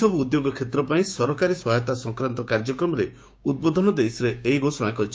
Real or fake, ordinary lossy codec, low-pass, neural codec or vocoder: fake; none; none; codec, 16 kHz, 6 kbps, DAC